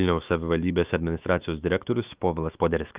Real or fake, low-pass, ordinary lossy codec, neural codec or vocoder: fake; 3.6 kHz; Opus, 32 kbps; autoencoder, 48 kHz, 128 numbers a frame, DAC-VAE, trained on Japanese speech